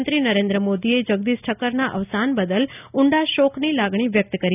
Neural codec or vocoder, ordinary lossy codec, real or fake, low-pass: none; none; real; 3.6 kHz